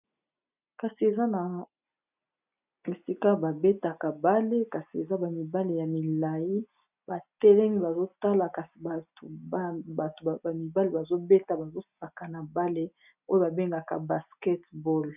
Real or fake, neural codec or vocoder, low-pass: real; none; 3.6 kHz